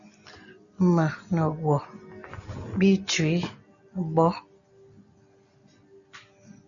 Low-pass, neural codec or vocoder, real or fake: 7.2 kHz; none; real